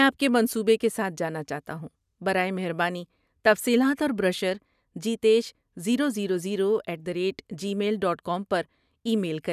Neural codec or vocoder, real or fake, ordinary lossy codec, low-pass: none; real; none; 14.4 kHz